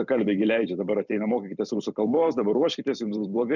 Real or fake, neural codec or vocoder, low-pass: fake; vocoder, 44.1 kHz, 128 mel bands every 256 samples, BigVGAN v2; 7.2 kHz